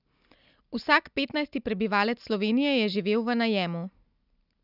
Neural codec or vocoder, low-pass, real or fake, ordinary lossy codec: none; 5.4 kHz; real; none